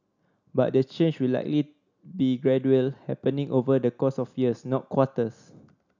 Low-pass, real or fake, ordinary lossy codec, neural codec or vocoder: 7.2 kHz; real; none; none